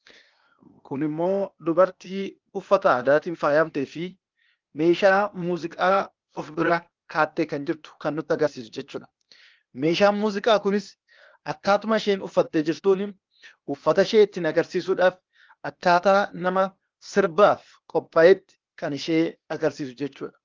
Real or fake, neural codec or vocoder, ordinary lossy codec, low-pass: fake; codec, 16 kHz, 0.8 kbps, ZipCodec; Opus, 24 kbps; 7.2 kHz